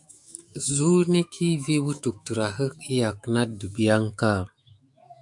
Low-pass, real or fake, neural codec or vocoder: 10.8 kHz; fake; autoencoder, 48 kHz, 128 numbers a frame, DAC-VAE, trained on Japanese speech